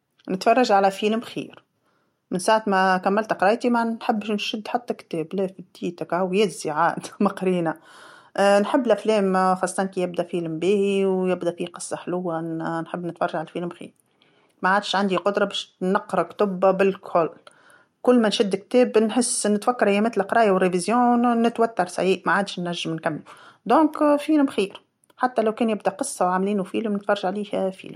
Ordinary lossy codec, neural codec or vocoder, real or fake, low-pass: MP3, 64 kbps; none; real; 19.8 kHz